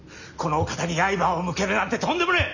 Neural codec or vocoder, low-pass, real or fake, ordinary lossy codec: none; 7.2 kHz; real; none